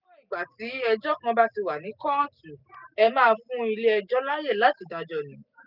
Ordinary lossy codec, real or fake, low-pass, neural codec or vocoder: none; real; 5.4 kHz; none